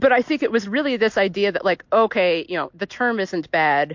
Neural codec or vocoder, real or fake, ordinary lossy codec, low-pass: none; real; MP3, 48 kbps; 7.2 kHz